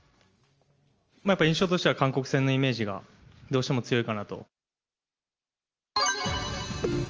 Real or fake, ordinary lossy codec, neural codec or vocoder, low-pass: real; Opus, 24 kbps; none; 7.2 kHz